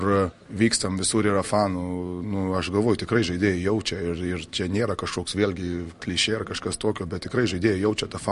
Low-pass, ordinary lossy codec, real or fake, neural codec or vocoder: 14.4 kHz; MP3, 48 kbps; real; none